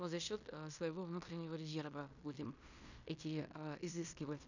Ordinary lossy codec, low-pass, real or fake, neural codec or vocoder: none; 7.2 kHz; fake; codec, 16 kHz in and 24 kHz out, 0.9 kbps, LongCat-Audio-Codec, fine tuned four codebook decoder